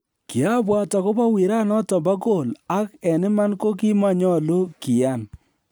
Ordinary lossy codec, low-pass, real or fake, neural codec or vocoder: none; none; real; none